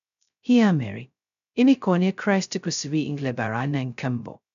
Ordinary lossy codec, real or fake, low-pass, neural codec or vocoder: none; fake; 7.2 kHz; codec, 16 kHz, 0.2 kbps, FocalCodec